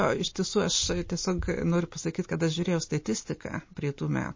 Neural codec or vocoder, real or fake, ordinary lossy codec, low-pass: none; real; MP3, 32 kbps; 7.2 kHz